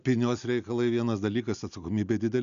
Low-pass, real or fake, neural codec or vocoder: 7.2 kHz; real; none